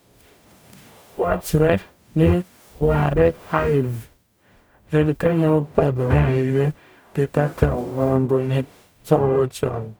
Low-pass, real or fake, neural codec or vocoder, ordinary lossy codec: none; fake; codec, 44.1 kHz, 0.9 kbps, DAC; none